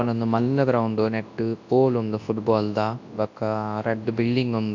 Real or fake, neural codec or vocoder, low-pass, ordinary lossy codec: fake; codec, 24 kHz, 0.9 kbps, WavTokenizer, large speech release; 7.2 kHz; AAC, 48 kbps